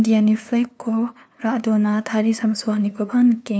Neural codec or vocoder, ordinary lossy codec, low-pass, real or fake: codec, 16 kHz, 2 kbps, FunCodec, trained on LibriTTS, 25 frames a second; none; none; fake